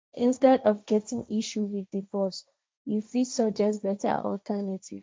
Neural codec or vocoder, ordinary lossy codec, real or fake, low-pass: codec, 16 kHz, 1.1 kbps, Voila-Tokenizer; none; fake; none